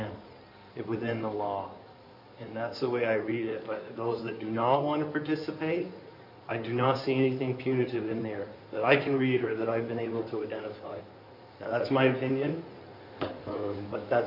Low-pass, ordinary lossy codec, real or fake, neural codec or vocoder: 5.4 kHz; MP3, 32 kbps; fake; codec, 16 kHz in and 24 kHz out, 2.2 kbps, FireRedTTS-2 codec